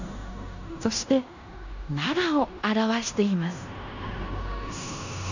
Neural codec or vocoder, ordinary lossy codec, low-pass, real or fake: codec, 16 kHz in and 24 kHz out, 0.9 kbps, LongCat-Audio-Codec, fine tuned four codebook decoder; none; 7.2 kHz; fake